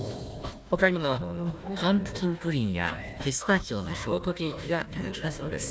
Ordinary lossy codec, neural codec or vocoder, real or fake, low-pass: none; codec, 16 kHz, 1 kbps, FunCodec, trained on Chinese and English, 50 frames a second; fake; none